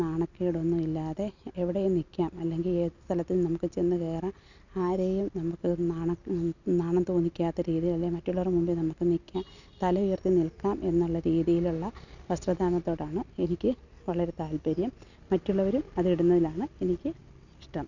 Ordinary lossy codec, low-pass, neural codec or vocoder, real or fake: none; 7.2 kHz; none; real